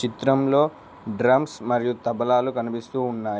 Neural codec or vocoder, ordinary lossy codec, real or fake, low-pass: none; none; real; none